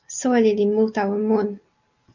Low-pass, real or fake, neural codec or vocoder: 7.2 kHz; real; none